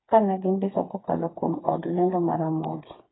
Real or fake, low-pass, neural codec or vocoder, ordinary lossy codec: fake; 7.2 kHz; codec, 16 kHz, 4 kbps, FreqCodec, smaller model; AAC, 16 kbps